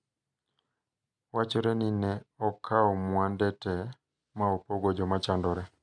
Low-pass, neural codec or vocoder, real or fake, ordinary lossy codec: 9.9 kHz; none; real; none